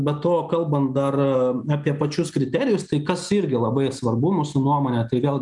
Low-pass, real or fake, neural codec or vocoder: 10.8 kHz; real; none